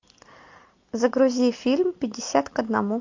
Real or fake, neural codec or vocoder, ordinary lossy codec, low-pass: real; none; MP3, 48 kbps; 7.2 kHz